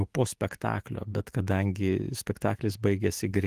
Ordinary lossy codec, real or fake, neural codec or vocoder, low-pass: Opus, 24 kbps; fake; autoencoder, 48 kHz, 128 numbers a frame, DAC-VAE, trained on Japanese speech; 14.4 kHz